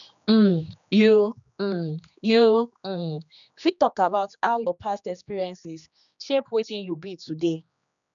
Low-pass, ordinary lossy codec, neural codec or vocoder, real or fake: 7.2 kHz; none; codec, 16 kHz, 2 kbps, X-Codec, HuBERT features, trained on general audio; fake